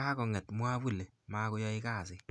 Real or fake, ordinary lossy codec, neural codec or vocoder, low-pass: real; none; none; none